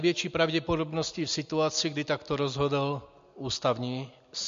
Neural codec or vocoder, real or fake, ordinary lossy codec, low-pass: none; real; MP3, 48 kbps; 7.2 kHz